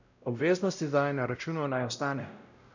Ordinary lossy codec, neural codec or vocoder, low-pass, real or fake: none; codec, 16 kHz, 0.5 kbps, X-Codec, WavLM features, trained on Multilingual LibriSpeech; 7.2 kHz; fake